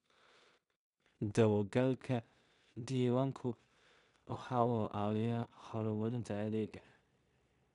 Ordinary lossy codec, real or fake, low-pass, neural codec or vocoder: none; fake; 10.8 kHz; codec, 16 kHz in and 24 kHz out, 0.4 kbps, LongCat-Audio-Codec, two codebook decoder